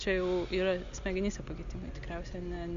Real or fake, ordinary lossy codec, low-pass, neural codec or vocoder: real; Opus, 64 kbps; 7.2 kHz; none